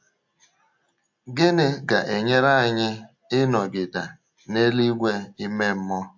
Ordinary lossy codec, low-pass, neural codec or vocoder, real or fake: MP3, 48 kbps; 7.2 kHz; none; real